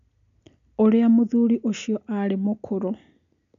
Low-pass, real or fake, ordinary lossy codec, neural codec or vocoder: 7.2 kHz; real; none; none